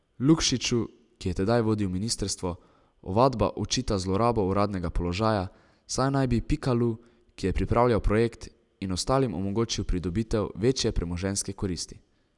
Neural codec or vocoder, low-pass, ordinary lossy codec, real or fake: none; 10.8 kHz; none; real